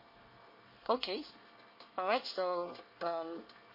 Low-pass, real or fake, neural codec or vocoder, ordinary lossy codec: 5.4 kHz; fake; codec, 24 kHz, 1 kbps, SNAC; MP3, 32 kbps